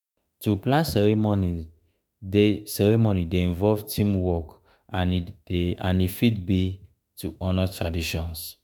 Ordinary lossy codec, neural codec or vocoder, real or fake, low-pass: none; autoencoder, 48 kHz, 32 numbers a frame, DAC-VAE, trained on Japanese speech; fake; none